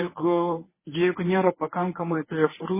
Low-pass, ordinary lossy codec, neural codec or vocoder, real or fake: 3.6 kHz; MP3, 16 kbps; codec, 24 kHz, 0.9 kbps, WavTokenizer, medium speech release version 1; fake